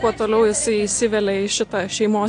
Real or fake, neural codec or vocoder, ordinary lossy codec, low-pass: real; none; AAC, 48 kbps; 9.9 kHz